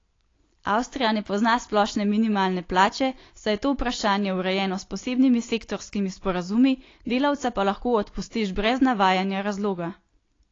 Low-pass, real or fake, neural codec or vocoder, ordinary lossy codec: 7.2 kHz; real; none; AAC, 32 kbps